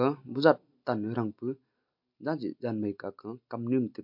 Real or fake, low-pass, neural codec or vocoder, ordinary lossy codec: real; 5.4 kHz; none; MP3, 48 kbps